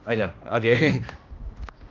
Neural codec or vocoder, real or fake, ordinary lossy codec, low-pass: codec, 16 kHz, 1 kbps, X-Codec, HuBERT features, trained on balanced general audio; fake; Opus, 32 kbps; 7.2 kHz